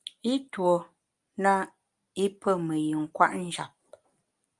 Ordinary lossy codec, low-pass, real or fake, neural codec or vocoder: Opus, 32 kbps; 10.8 kHz; real; none